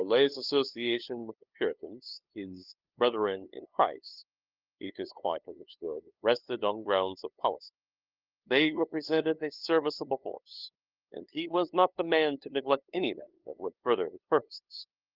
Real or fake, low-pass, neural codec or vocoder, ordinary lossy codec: fake; 5.4 kHz; codec, 16 kHz, 2 kbps, FunCodec, trained on LibriTTS, 25 frames a second; Opus, 32 kbps